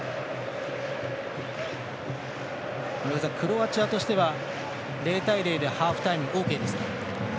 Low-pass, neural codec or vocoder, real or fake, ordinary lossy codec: none; none; real; none